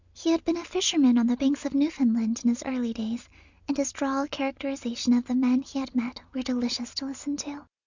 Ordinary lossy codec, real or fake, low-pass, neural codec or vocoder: Opus, 64 kbps; real; 7.2 kHz; none